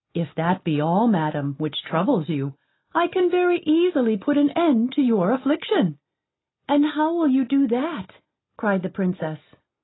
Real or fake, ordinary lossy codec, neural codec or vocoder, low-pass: real; AAC, 16 kbps; none; 7.2 kHz